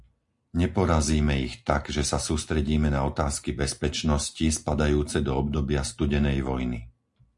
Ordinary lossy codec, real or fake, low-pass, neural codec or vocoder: MP3, 64 kbps; real; 10.8 kHz; none